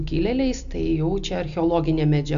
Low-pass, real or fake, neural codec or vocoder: 7.2 kHz; real; none